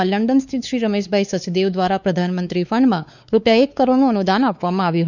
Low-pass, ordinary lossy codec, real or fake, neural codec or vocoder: 7.2 kHz; none; fake; codec, 16 kHz, 4 kbps, X-Codec, WavLM features, trained on Multilingual LibriSpeech